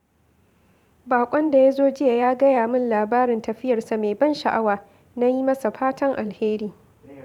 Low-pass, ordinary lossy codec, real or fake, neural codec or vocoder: 19.8 kHz; none; fake; vocoder, 44.1 kHz, 128 mel bands every 512 samples, BigVGAN v2